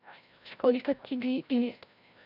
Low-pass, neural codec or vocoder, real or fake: 5.4 kHz; codec, 16 kHz, 0.5 kbps, FreqCodec, larger model; fake